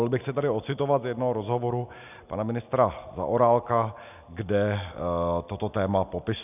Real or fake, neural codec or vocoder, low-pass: real; none; 3.6 kHz